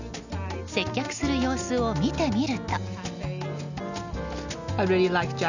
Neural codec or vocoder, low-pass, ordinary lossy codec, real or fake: none; 7.2 kHz; none; real